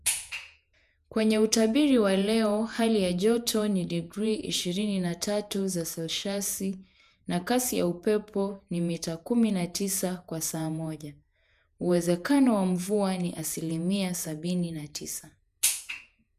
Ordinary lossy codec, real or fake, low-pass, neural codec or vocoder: none; fake; 14.4 kHz; vocoder, 48 kHz, 128 mel bands, Vocos